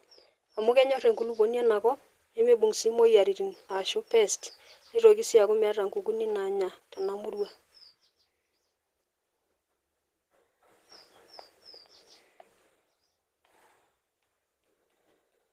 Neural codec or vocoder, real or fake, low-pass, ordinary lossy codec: none; real; 14.4 kHz; Opus, 16 kbps